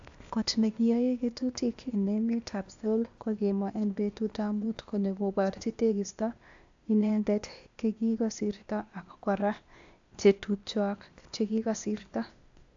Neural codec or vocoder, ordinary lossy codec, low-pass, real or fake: codec, 16 kHz, 0.8 kbps, ZipCodec; AAC, 64 kbps; 7.2 kHz; fake